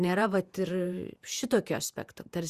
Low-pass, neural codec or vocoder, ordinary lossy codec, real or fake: 14.4 kHz; vocoder, 48 kHz, 128 mel bands, Vocos; Opus, 64 kbps; fake